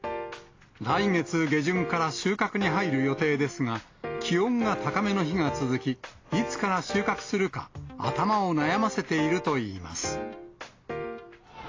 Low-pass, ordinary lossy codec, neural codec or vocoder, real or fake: 7.2 kHz; AAC, 32 kbps; none; real